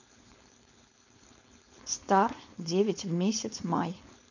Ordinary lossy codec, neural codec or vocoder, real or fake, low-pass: none; codec, 16 kHz, 4.8 kbps, FACodec; fake; 7.2 kHz